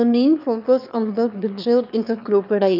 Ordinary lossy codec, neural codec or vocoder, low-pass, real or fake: none; autoencoder, 22.05 kHz, a latent of 192 numbers a frame, VITS, trained on one speaker; 5.4 kHz; fake